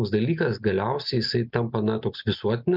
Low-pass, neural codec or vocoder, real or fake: 5.4 kHz; none; real